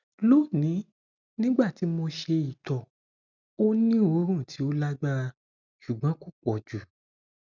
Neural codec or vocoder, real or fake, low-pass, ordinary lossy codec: none; real; 7.2 kHz; none